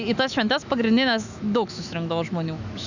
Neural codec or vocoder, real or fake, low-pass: autoencoder, 48 kHz, 128 numbers a frame, DAC-VAE, trained on Japanese speech; fake; 7.2 kHz